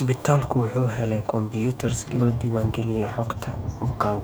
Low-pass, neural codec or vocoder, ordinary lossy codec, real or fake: none; codec, 44.1 kHz, 2.6 kbps, DAC; none; fake